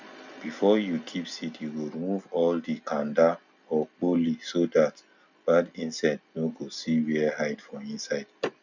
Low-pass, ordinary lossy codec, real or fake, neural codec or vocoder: 7.2 kHz; none; real; none